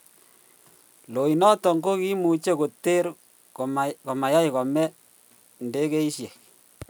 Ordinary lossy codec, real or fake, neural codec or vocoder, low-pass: none; real; none; none